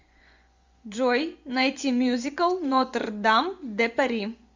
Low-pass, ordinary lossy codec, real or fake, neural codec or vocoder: 7.2 kHz; MP3, 64 kbps; fake; vocoder, 24 kHz, 100 mel bands, Vocos